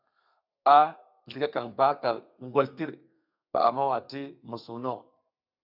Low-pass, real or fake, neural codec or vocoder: 5.4 kHz; fake; codec, 32 kHz, 1.9 kbps, SNAC